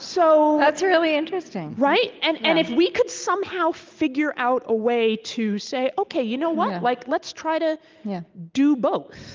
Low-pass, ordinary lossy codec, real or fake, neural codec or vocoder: 7.2 kHz; Opus, 24 kbps; real; none